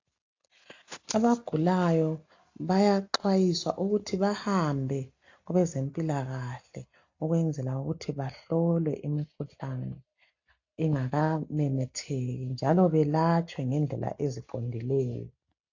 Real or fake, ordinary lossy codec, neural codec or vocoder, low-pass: real; AAC, 48 kbps; none; 7.2 kHz